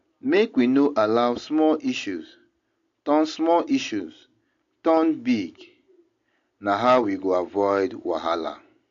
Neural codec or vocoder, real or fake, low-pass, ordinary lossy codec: none; real; 7.2 kHz; AAC, 48 kbps